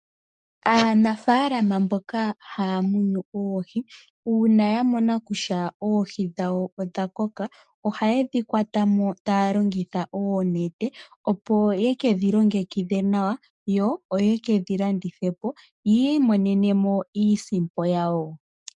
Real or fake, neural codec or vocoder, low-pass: fake; codec, 44.1 kHz, 7.8 kbps, DAC; 10.8 kHz